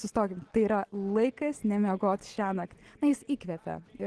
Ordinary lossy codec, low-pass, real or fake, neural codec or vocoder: Opus, 16 kbps; 10.8 kHz; real; none